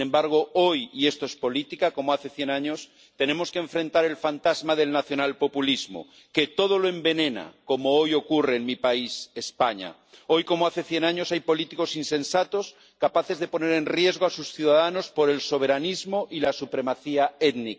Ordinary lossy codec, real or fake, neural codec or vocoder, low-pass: none; real; none; none